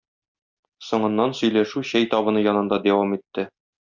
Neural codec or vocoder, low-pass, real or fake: none; 7.2 kHz; real